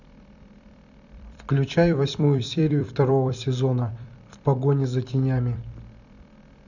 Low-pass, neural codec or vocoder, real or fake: 7.2 kHz; vocoder, 44.1 kHz, 128 mel bands every 256 samples, BigVGAN v2; fake